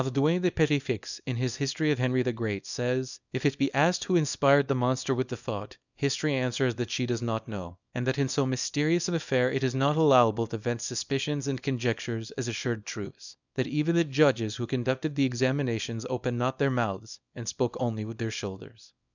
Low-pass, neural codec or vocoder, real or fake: 7.2 kHz; codec, 24 kHz, 0.9 kbps, WavTokenizer, small release; fake